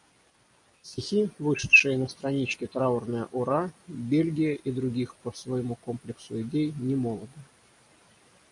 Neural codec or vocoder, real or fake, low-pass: none; real; 10.8 kHz